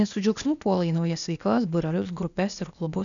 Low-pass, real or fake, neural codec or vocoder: 7.2 kHz; fake; codec, 16 kHz, 0.8 kbps, ZipCodec